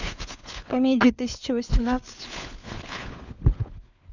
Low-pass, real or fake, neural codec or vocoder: 7.2 kHz; fake; codec, 16 kHz, 4 kbps, FunCodec, trained on LibriTTS, 50 frames a second